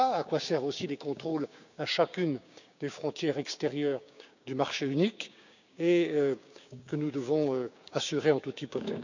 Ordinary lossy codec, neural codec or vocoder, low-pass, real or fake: none; codec, 16 kHz, 6 kbps, DAC; 7.2 kHz; fake